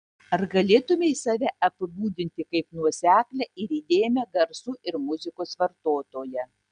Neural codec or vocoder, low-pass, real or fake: none; 9.9 kHz; real